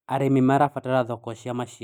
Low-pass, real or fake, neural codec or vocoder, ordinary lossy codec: 19.8 kHz; real; none; none